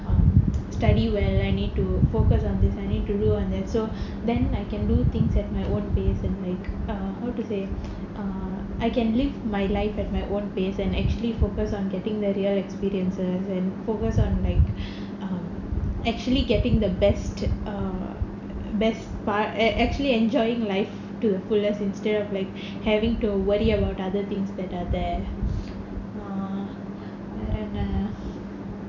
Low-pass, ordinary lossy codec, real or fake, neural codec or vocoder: 7.2 kHz; none; real; none